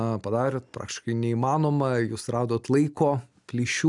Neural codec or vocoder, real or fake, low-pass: none; real; 10.8 kHz